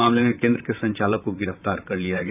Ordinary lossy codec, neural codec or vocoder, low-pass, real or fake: none; vocoder, 44.1 kHz, 128 mel bands, Pupu-Vocoder; 3.6 kHz; fake